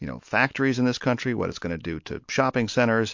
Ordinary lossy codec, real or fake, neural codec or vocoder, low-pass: MP3, 48 kbps; real; none; 7.2 kHz